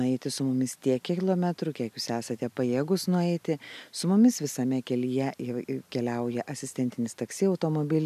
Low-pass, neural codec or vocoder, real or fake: 14.4 kHz; none; real